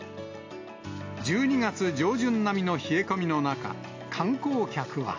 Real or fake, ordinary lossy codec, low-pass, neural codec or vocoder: real; AAC, 48 kbps; 7.2 kHz; none